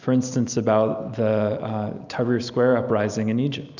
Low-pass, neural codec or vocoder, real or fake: 7.2 kHz; none; real